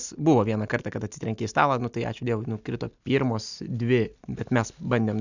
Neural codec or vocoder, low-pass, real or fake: none; 7.2 kHz; real